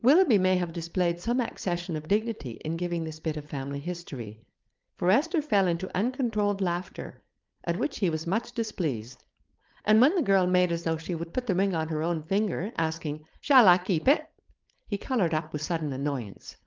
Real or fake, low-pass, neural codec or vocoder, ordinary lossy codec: fake; 7.2 kHz; codec, 16 kHz, 4.8 kbps, FACodec; Opus, 32 kbps